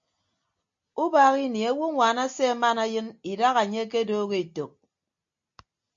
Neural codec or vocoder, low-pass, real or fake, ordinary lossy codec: none; 7.2 kHz; real; MP3, 48 kbps